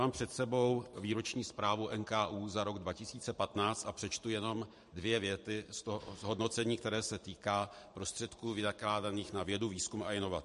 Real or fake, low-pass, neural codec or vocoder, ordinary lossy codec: fake; 14.4 kHz; vocoder, 44.1 kHz, 128 mel bands every 512 samples, BigVGAN v2; MP3, 48 kbps